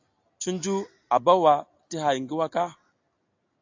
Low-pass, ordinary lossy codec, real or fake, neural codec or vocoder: 7.2 kHz; AAC, 48 kbps; real; none